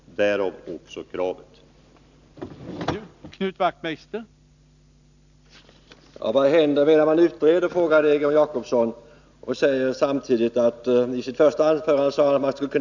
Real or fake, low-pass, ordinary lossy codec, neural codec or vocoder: real; 7.2 kHz; none; none